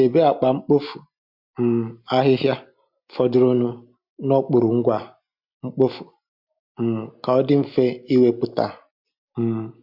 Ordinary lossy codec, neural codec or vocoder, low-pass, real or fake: MP3, 48 kbps; none; 5.4 kHz; real